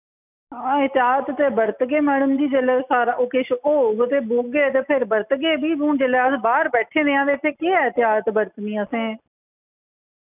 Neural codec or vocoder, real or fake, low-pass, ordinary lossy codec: none; real; 3.6 kHz; none